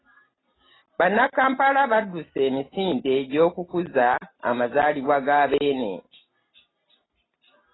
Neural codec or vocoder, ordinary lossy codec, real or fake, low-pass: none; AAC, 16 kbps; real; 7.2 kHz